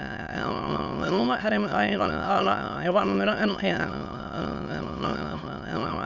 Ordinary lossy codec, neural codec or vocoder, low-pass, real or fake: none; autoencoder, 22.05 kHz, a latent of 192 numbers a frame, VITS, trained on many speakers; 7.2 kHz; fake